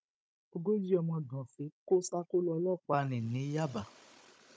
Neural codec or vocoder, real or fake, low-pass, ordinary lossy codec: codec, 16 kHz, 16 kbps, FunCodec, trained on Chinese and English, 50 frames a second; fake; none; none